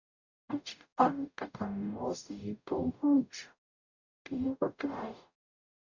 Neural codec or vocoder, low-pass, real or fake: codec, 44.1 kHz, 0.9 kbps, DAC; 7.2 kHz; fake